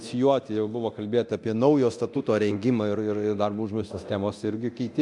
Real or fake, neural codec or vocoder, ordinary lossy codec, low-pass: fake; codec, 24 kHz, 0.9 kbps, DualCodec; MP3, 96 kbps; 10.8 kHz